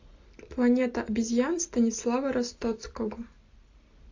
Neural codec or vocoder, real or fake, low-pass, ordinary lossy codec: none; real; 7.2 kHz; AAC, 48 kbps